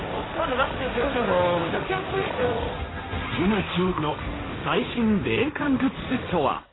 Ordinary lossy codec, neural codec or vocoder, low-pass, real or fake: AAC, 16 kbps; codec, 16 kHz, 1.1 kbps, Voila-Tokenizer; 7.2 kHz; fake